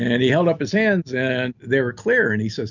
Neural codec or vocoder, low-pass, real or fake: none; 7.2 kHz; real